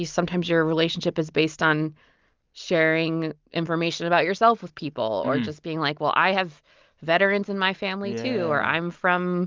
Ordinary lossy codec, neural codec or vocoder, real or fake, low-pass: Opus, 24 kbps; none; real; 7.2 kHz